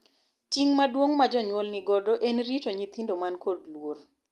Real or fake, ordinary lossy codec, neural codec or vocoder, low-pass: real; Opus, 32 kbps; none; 14.4 kHz